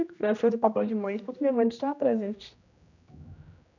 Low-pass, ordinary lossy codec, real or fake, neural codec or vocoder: 7.2 kHz; none; fake; codec, 16 kHz, 1 kbps, X-Codec, HuBERT features, trained on general audio